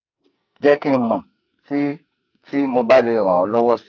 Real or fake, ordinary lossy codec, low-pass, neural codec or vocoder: fake; AAC, 48 kbps; 7.2 kHz; codec, 44.1 kHz, 2.6 kbps, SNAC